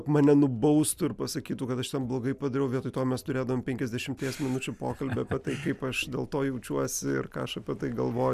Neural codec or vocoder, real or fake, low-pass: none; real; 14.4 kHz